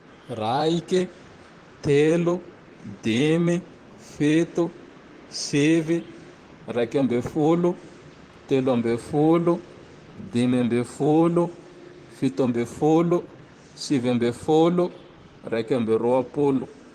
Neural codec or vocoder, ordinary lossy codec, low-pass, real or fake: vocoder, 44.1 kHz, 128 mel bands, Pupu-Vocoder; Opus, 24 kbps; 14.4 kHz; fake